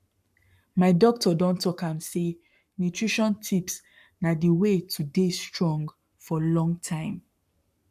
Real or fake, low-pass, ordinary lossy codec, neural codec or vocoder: fake; 14.4 kHz; none; codec, 44.1 kHz, 7.8 kbps, Pupu-Codec